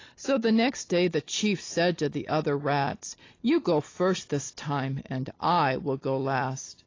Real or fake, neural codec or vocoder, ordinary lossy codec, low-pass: real; none; AAC, 32 kbps; 7.2 kHz